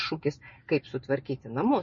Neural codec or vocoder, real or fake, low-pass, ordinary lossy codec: none; real; 7.2 kHz; MP3, 32 kbps